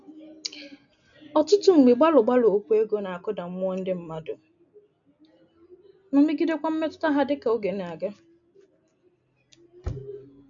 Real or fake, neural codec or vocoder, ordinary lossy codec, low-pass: real; none; none; 7.2 kHz